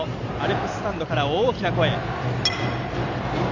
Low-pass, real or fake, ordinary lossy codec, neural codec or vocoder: 7.2 kHz; real; none; none